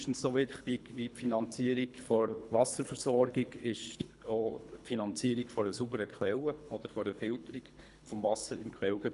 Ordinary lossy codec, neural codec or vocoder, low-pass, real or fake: none; codec, 24 kHz, 3 kbps, HILCodec; 10.8 kHz; fake